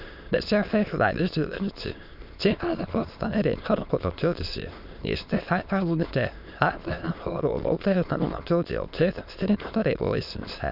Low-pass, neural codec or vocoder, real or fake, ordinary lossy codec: 5.4 kHz; autoencoder, 22.05 kHz, a latent of 192 numbers a frame, VITS, trained on many speakers; fake; none